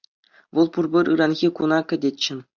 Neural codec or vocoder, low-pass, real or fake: none; 7.2 kHz; real